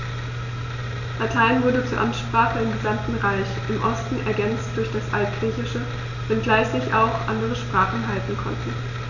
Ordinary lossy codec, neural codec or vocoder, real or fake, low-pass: none; none; real; 7.2 kHz